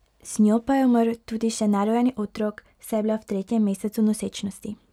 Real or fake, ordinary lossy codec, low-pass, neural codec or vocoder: real; none; 19.8 kHz; none